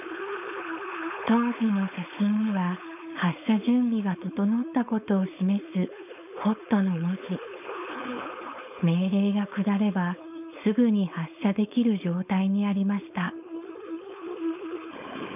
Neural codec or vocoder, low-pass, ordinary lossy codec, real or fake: codec, 16 kHz, 4.8 kbps, FACodec; 3.6 kHz; none; fake